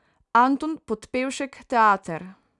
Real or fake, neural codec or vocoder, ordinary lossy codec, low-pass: real; none; none; 10.8 kHz